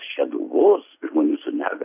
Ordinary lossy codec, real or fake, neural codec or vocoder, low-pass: AAC, 32 kbps; real; none; 3.6 kHz